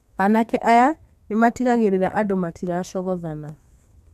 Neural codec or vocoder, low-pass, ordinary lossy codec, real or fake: codec, 32 kHz, 1.9 kbps, SNAC; 14.4 kHz; none; fake